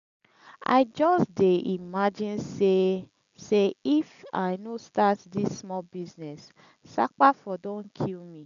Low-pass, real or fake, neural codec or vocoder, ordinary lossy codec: 7.2 kHz; real; none; none